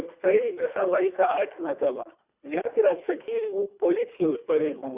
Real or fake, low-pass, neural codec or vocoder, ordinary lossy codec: fake; 3.6 kHz; codec, 24 kHz, 1.5 kbps, HILCodec; Opus, 64 kbps